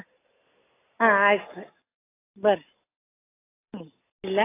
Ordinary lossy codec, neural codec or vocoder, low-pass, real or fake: AAC, 16 kbps; none; 3.6 kHz; real